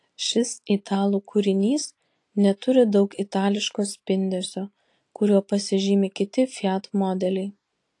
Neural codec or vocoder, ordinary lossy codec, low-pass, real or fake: none; AAC, 48 kbps; 10.8 kHz; real